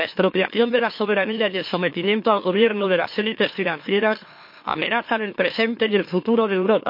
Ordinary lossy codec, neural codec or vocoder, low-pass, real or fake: MP3, 32 kbps; autoencoder, 44.1 kHz, a latent of 192 numbers a frame, MeloTTS; 5.4 kHz; fake